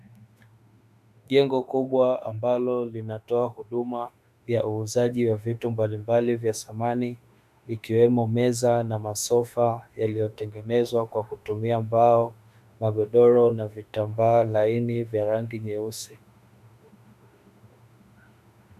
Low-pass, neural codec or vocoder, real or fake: 14.4 kHz; autoencoder, 48 kHz, 32 numbers a frame, DAC-VAE, trained on Japanese speech; fake